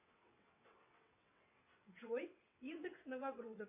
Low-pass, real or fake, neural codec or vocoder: 3.6 kHz; fake; vocoder, 22.05 kHz, 80 mel bands, WaveNeXt